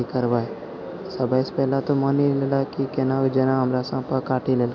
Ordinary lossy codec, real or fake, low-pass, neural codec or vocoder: none; real; 7.2 kHz; none